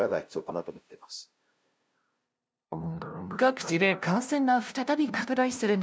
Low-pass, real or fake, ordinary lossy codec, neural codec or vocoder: none; fake; none; codec, 16 kHz, 0.5 kbps, FunCodec, trained on LibriTTS, 25 frames a second